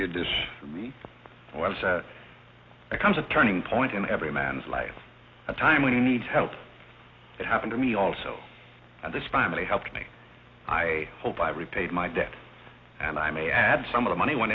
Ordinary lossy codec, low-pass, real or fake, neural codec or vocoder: AAC, 32 kbps; 7.2 kHz; real; none